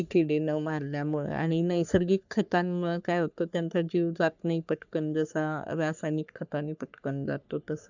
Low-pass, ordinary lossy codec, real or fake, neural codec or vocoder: 7.2 kHz; none; fake; codec, 44.1 kHz, 3.4 kbps, Pupu-Codec